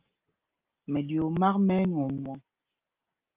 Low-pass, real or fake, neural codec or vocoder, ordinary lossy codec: 3.6 kHz; real; none; Opus, 24 kbps